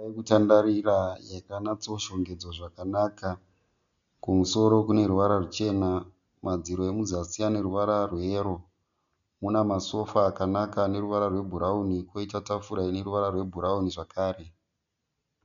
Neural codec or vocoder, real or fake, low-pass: none; real; 7.2 kHz